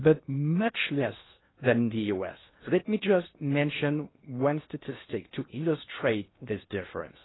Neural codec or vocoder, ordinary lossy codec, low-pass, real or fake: codec, 16 kHz in and 24 kHz out, 0.8 kbps, FocalCodec, streaming, 65536 codes; AAC, 16 kbps; 7.2 kHz; fake